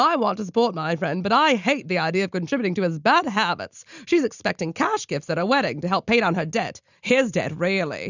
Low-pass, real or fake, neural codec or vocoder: 7.2 kHz; real; none